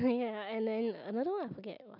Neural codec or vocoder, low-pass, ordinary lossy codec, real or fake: none; 5.4 kHz; none; real